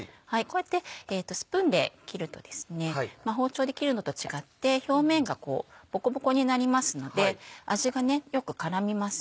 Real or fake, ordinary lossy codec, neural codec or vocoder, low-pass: real; none; none; none